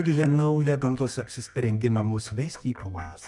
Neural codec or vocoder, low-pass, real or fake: codec, 24 kHz, 0.9 kbps, WavTokenizer, medium music audio release; 10.8 kHz; fake